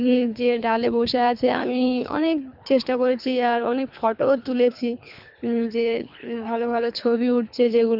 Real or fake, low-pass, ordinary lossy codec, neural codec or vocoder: fake; 5.4 kHz; none; codec, 24 kHz, 3 kbps, HILCodec